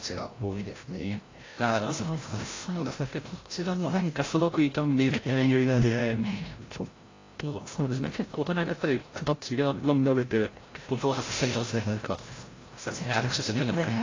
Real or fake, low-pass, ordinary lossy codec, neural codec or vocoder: fake; 7.2 kHz; AAC, 32 kbps; codec, 16 kHz, 0.5 kbps, FreqCodec, larger model